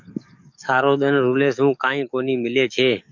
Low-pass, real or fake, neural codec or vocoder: 7.2 kHz; fake; codec, 44.1 kHz, 7.8 kbps, DAC